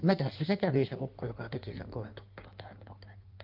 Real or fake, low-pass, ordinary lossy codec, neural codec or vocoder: fake; 5.4 kHz; Opus, 32 kbps; codec, 16 kHz in and 24 kHz out, 1.1 kbps, FireRedTTS-2 codec